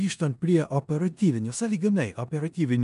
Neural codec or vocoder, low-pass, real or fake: codec, 16 kHz in and 24 kHz out, 0.9 kbps, LongCat-Audio-Codec, four codebook decoder; 10.8 kHz; fake